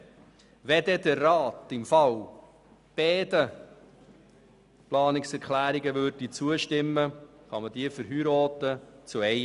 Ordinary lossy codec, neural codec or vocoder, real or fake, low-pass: none; none; real; 10.8 kHz